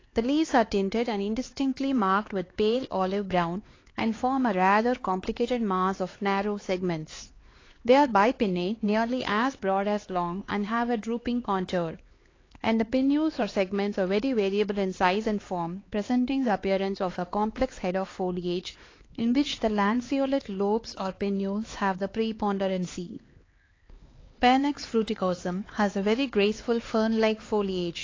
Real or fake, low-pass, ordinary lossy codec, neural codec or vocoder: fake; 7.2 kHz; AAC, 32 kbps; codec, 16 kHz, 4 kbps, X-Codec, HuBERT features, trained on LibriSpeech